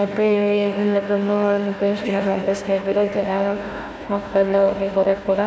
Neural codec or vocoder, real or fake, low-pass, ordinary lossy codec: codec, 16 kHz, 1 kbps, FunCodec, trained on Chinese and English, 50 frames a second; fake; none; none